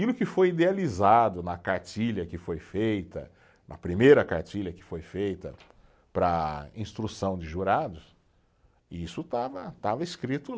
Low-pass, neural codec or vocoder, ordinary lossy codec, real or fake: none; none; none; real